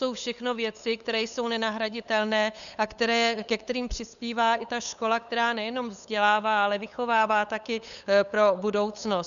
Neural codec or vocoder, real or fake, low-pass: codec, 16 kHz, 8 kbps, FunCodec, trained on LibriTTS, 25 frames a second; fake; 7.2 kHz